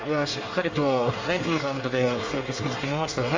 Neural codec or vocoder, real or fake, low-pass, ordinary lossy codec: codec, 24 kHz, 1 kbps, SNAC; fake; 7.2 kHz; Opus, 32 kbps